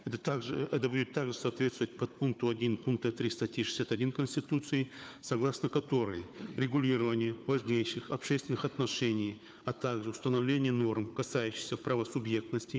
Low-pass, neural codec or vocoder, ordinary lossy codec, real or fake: none; codec, 16 kHz, 4 kbps, FunCodec, trained on Chinese and English, 50 frames a second; none; fake